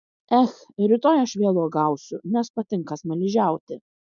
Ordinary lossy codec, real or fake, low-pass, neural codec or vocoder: MP3, 96 kbps; real; 7.2 kHz; none